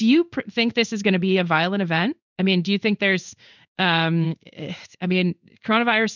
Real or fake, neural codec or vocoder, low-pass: fake; codec, 16 kHz in and 24 kHz out, 1 kbps, XY-Tokenizer; 7.2 kHz